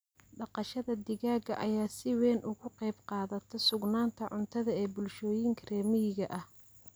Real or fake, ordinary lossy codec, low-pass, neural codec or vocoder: real; none; none; none